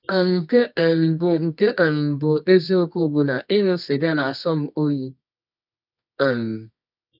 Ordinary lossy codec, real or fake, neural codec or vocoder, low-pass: none; fake; codec, 24 kHz, 0.9 kbps, WavTokenizer, medium music audio release; 5.4 kHz